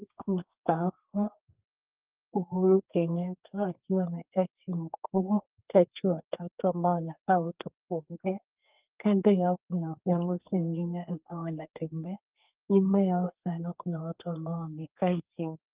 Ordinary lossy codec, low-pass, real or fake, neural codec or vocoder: Opus, 32 kbps; 3.6 kHz; fake; codec, 32 kHz, 1.9 kbps, SNAC